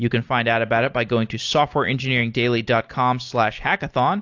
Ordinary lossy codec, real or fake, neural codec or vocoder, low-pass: AAC, 48 kbps; real; none; 7.2 kHz